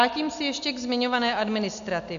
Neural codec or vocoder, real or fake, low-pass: none; real; 7.2 kHz